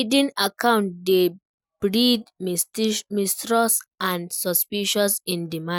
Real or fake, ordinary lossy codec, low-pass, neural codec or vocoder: real; none; none; none